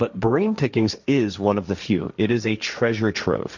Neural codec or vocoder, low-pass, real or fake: codec, 16 kHz, 1.1 kbps, Voila-Tokenizer; 7.2 kHz; fake